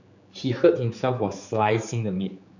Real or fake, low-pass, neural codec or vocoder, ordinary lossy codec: fake; 7.2 kHz; codec, 16 kHz, 4 kbps, X-Codec, HuBERT features, trained on general audio; none